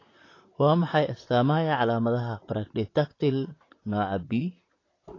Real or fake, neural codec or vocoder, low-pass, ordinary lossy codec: fake; vocoder, 44.1 kHz, 128 mel bands, Pupu-Vocoder; 7.2 kHz; AAC, 32 kbps